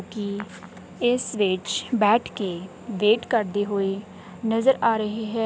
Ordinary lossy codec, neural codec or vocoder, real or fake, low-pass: none; none; real; none